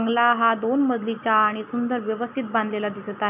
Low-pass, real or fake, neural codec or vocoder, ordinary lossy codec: 3.6 kHz; real; none; none